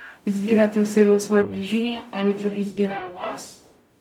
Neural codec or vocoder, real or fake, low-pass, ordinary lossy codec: codec, 44.1 kHz, 0.9 kbps, DAC; fake; 19.8 kHz; none